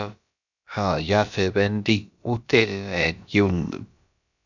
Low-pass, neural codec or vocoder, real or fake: 7.2 kHz; codec, 16 kHz, about 1 kbps, DyCAST, with the encoder's durations; fake